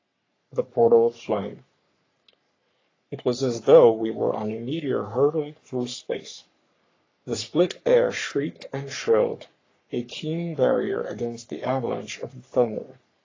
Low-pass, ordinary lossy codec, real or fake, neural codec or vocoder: 7.2 kHz; AAC, 32 kbps; fake; codec, 44.1 kHz, 3.4 kbps, Pupu-Codec